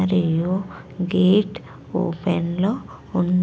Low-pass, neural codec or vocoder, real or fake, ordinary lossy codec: none; none; real; none